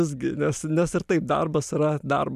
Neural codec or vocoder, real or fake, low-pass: none; real; 14.4 kHz